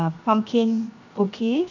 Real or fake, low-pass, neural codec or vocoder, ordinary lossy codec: fake; 7.2 kHz; codec, 16 kHz, 0.7 kbps, FocalCodec; none